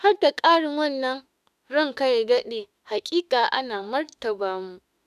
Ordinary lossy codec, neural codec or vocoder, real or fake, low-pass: none; autoencoder, 48 kHz, 32 numbers a frame, DAC-VAE, trained on Japanese speech; fake; 14.4 kHz